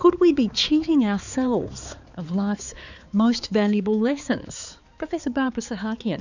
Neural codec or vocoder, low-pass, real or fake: codec, 16 kHz, 4 kbps, X-Codec, HuBERT features, trained on balanced general audio; 7.2 kHz; fake